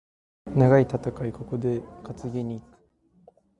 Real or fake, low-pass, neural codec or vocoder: real; 10.8 kHz; none